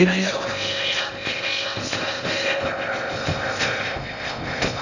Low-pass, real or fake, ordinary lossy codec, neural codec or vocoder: 7.2 kHz; fake; none; codec, 16 kHz in and 24 kHz out, 0.6 kbps, FocalCodec, streaming, 2048 codes